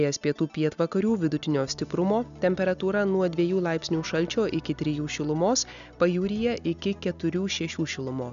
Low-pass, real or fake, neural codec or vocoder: 7.2 kHz; real; none